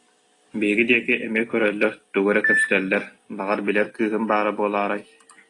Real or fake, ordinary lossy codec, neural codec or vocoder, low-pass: real; AAC, 32 kbps; none; 10.8 kHz